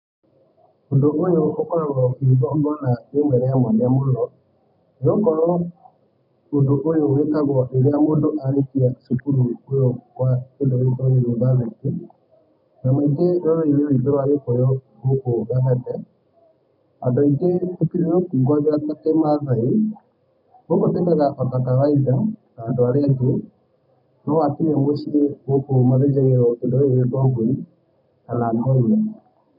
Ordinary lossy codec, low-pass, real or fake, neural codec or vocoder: none; 5.4 kHz; real; none